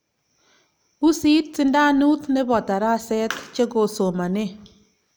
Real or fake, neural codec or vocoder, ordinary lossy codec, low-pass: real; none; none; none